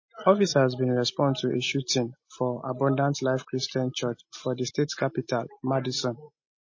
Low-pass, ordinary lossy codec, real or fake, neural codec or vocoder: 7.2 kHz; MP3, 32 kbps; real; none